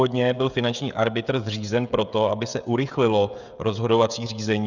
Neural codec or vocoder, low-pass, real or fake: codec, 16 kHz, 16 kbps, FreqCodec, smaller model; 7.2 kHz; fake